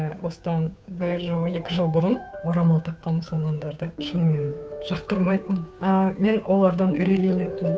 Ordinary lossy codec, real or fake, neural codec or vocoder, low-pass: none; fake; codec, 16 kHz, 2 kbps, FunCodec, trained on Chinese and English, 25 frames a second; none